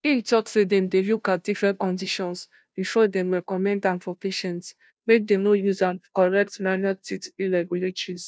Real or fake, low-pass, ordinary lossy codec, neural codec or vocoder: fake; none; none; codec, 16 kHz, 0.5 kbps, FunCodec, trained on Chinese and English, 25 frames a second